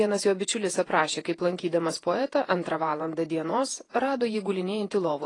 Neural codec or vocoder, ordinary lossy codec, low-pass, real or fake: vocoder, 48 kHz, 128 mel bands, Vocos; AAC, 32 kbps; 10.8 kHz; fake